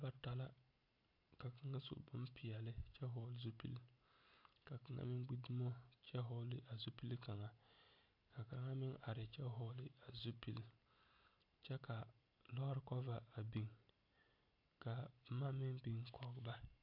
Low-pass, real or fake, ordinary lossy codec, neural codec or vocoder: 5.4 kHz; real; AAC, 48 kbps; none